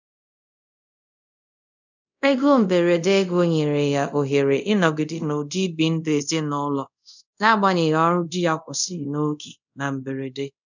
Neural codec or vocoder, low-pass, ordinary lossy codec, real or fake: codec, 24 kHz, 0.5 kbps, DualCodec; 7.2 kHz; none; fake